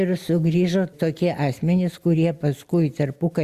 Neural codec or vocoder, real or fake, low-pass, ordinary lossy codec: none; real; 14.4 kHz; Opus, 64 kbps